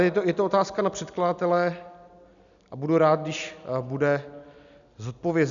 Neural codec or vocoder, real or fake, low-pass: none; real; 7.2 kHz